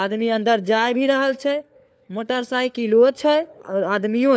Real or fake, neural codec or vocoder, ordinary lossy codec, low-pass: fake; codec, 16 kHz, 4 kbps, FunCodec, trained on LibriTTS, 50 frames a second; none; none